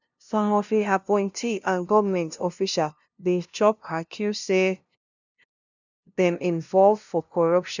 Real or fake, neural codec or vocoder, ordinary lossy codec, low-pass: fake; codec, 16 kHz, 0.5 kbps, FunCodec, trained on LibriTTS, 25 frames a second; none; 7.2 kHz